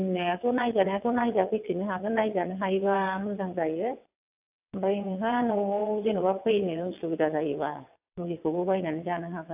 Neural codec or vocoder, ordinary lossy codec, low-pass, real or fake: vocoder, 22.05 kHz, 80 mel bands, WaveNeXt; AAC, 32 kbps; 3.6 kHz; fake